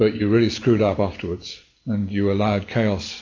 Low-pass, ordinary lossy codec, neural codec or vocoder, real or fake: 7.2 kHz; AAC, 48 kbps; none; real